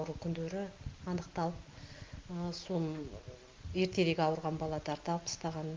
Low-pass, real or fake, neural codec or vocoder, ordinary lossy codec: 7.2 kHz; real; none; Opus, 32 kbps